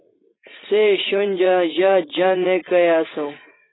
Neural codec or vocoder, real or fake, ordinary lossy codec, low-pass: vocoder, 44.1 kHz, 80 mel bands, Vocos; fake; AAC, 16 kbps; 7.2 kHz